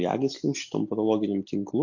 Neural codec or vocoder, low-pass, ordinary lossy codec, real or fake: none; 7.2 kHz; MP3, 48 kbps; real